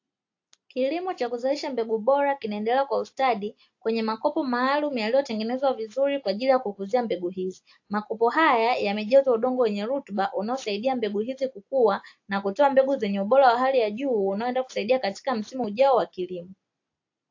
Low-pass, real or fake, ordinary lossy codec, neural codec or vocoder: 7.2 kHz; real; AAC, 48 kbps; none